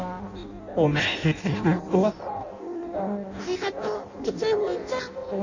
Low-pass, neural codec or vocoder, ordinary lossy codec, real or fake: 7.2 kHz; codec, 16 kHz in and 24 kHz out, 0.6 kbps, FireRedTTS-2 codec; none; fake